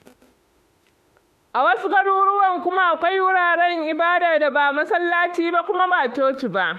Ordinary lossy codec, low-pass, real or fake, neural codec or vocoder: MP3, 96 kbps; 14.4 kHz; fake; autoencoder, 48 kHz, 32 numbers a frame, DAC-VAE, trained on Japanese speech